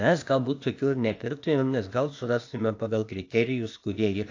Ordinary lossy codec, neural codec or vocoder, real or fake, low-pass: AAC, 48 kbps; codec, 16 kHz, 0.8 kbps, ZipCodec; fake; 7.2 kHz